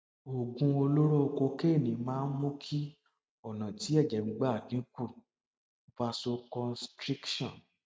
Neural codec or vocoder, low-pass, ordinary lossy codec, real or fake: none; none; none; real